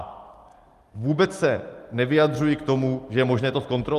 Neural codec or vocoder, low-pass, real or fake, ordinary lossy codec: none; 14.4 kHz; real; Opus, 24 kbps